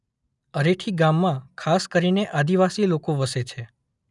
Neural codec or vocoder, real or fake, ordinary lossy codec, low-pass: none; real; none; 10.8 kHz